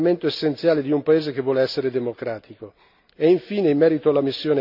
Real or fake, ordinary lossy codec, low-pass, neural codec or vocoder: real; none; 5.4 kHz; none